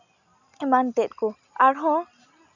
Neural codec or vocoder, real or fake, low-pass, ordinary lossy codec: none; real; 7.2 kHz; none